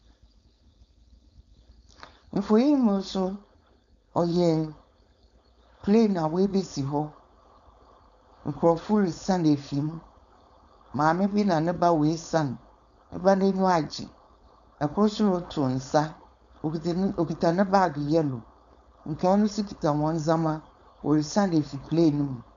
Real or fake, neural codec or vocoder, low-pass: fake; codec, 16 kHz, 4.8 kbps, FACodec; 7.2 kHz